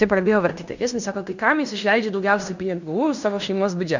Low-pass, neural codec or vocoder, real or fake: 7.2 kHz; codec, 16 kHz in and 24 kHz out, 0.9 kbps, LongCat-Audio-Codec, fine tuned four codebook decoder; fake